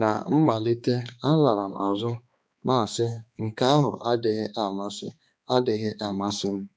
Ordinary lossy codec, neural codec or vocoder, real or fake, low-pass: none; codec, 16 kHz, 2 kbps, X-Codec, HuBERT features, trained on balanced general audio; fake; none